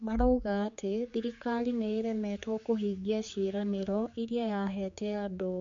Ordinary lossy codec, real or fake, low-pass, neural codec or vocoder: MP3, 48 kbps; fake; 7.2 kHz; codec, 16 kHz, 4 kbps, X-Codec, HuBERT features, trained on general audio